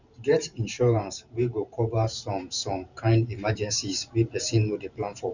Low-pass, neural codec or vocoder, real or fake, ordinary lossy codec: 7.2 kHz; vocoder, 44.1 kHz, 128 mel bands every 512 samples, BigVGAN v2; fake; none